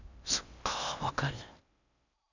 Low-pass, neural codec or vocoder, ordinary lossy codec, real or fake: 7.2 kHz; codec, 16 kHz in and 24 kHz out, 0.8 kbps, FocalCodec, streaming, 65536 codes; none; fake